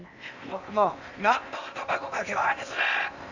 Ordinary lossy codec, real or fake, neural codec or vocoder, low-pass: none; fake; codec, 16 kHz in and 24 kHz out, 0.8 kbps, FocalCodec, streaming, 65536 codes; 7.2 kHz